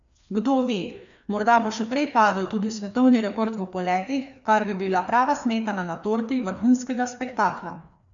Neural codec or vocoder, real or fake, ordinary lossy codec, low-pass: codec, 16 kHz, 2 kbps, FreqCodec, larger model; fake; none; 7.2 kHz